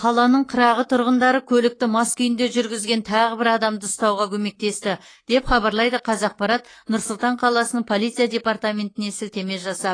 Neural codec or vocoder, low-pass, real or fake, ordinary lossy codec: codec, 24 kHz, 3.1 kbps, DualCodec; 9.9 kHz; fake; AAC, 32 kbps